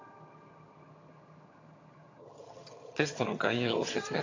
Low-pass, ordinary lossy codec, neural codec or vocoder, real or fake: 7.2 kHz; AAC, 32 kbps; vocoder, 22.05 kHz, 80 mel bands, HiFi-GAN; fake